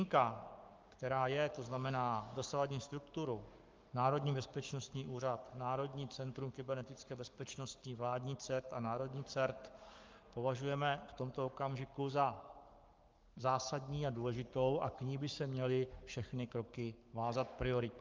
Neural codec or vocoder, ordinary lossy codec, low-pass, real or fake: codec, 44.1 kHz, 7.8 kbps, Pupu-Codec; Opus, 32 kbps; 7.2 kHz; fake